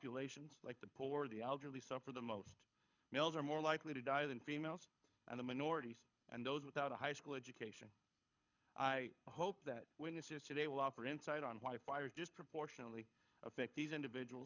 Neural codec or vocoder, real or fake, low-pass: codec, 24 kHz, 6 kbps, HILCodec; fake; 7.2 kHz